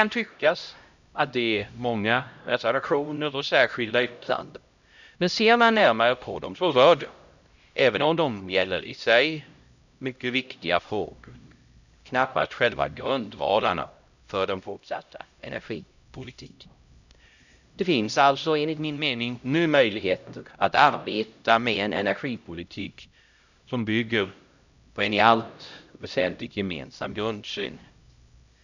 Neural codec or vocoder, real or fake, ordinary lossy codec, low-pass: codec, 16 kHz, 0.5 kbps, X-Codec, HuBERT features, trained on LibriSpeech; fake; none; 7.2 kHz